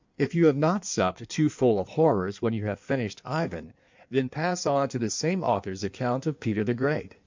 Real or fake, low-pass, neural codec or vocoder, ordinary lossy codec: fake; 7.2 kHz; codec, 16 kHz in and 24 kHz out, 1.1 kbps, FireRedTTS-2 codec; MP3, 64 kbps